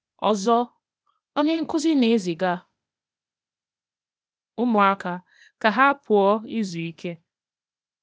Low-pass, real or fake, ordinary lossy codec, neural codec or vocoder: none; fake; none; codec, 16 kHz, 0.8 kbps, ZipCodec